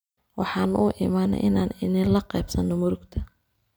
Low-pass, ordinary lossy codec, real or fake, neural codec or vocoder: none; none; real; none